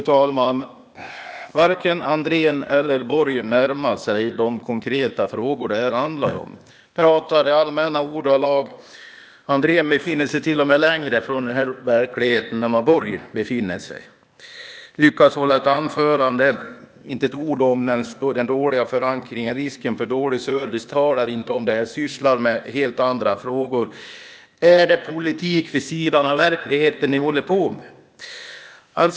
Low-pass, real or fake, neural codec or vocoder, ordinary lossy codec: none; fake; codec, 16 kHz, 0.8 kbps, ZipCodec; none